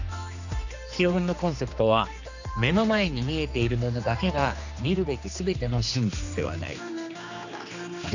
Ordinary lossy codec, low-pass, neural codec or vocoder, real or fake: none; 7.2 kHz; codec, 16 kHz, 2 kbps, X-Codec, HuBERT features, trained on general audio; fake